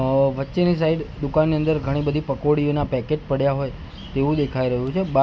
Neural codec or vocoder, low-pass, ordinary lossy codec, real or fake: none; none; none; real